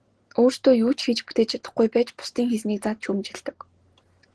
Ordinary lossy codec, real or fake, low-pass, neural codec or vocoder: Opus, 16 kbps; real; 10.8 kHz; none